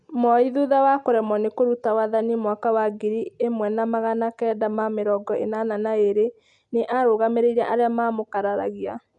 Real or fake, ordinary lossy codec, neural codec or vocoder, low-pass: real; MP3, 96 kbps; none; 10.8 kHz